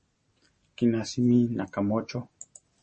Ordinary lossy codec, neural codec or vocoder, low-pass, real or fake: MP3, 32 kbps; none; 10.8 kHz; real